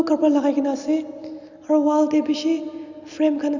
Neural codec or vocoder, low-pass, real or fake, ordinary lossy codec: none; 7.2 kHz; real; Opus, 64 kbps